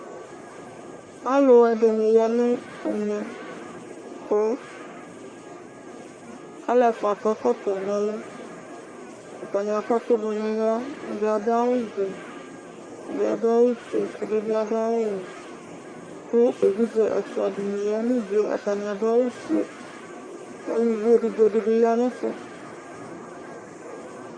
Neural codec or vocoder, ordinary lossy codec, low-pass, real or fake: codec, 44.1 kHz, 1.7 kbps, Pupu-Codec; Opus, 64 kbps; 9.9 kHz; fake